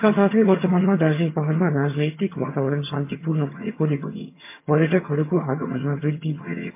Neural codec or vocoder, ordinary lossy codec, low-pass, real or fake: vocoder, 22.05 kHz, 80 mel bands, HiFi-GAN; MP3, 24 kbps; 3.6 kHz; fake